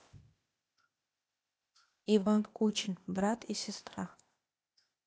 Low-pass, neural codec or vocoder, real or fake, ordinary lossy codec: none; codec, 16 kHz, 0.8 kbps, ZipCodec; fake; none